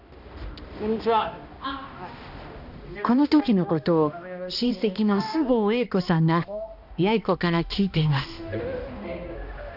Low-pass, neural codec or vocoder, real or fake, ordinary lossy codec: 5.4 kHz; codec, 16 kHz, 1 kbps, X-Codec, HuBERT features, trained on balanced general audio; fake; none